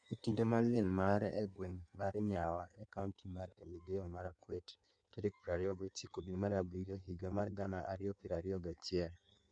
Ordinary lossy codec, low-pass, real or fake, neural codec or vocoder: none; 9.9 kHz; fake; codec, 16 kHz in and 24 kHz out, 1.1 kbps, FireRedTTS-2 codec